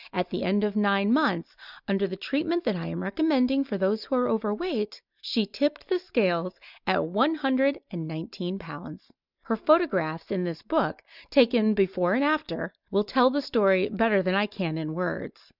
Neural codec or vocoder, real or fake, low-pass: none; real; 5.4 kHz